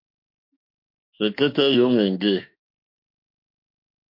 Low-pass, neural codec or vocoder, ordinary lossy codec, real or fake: 5.4 kHz; autoencoder, 48 kHz, 32 numbers a frame, DAC-VAE, trained on Japanese speech; MP3, 32 kbps; fake